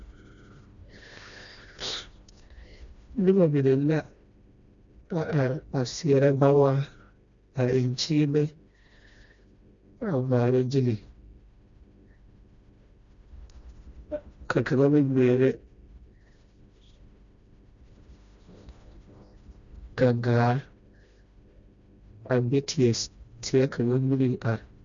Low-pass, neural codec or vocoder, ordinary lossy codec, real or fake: 7.2 kHz; codec, 16 kHz, 1 kbps, FreqCodec, smaller model; Opus, 64 kbps; fake